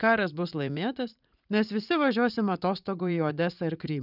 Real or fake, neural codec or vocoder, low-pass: fake; vocoder, 44.1 kHz, 80 mel bands, Vocos; 5.4 kHz